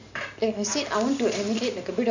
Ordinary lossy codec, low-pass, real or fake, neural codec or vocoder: none; 7.2 kHz; real; none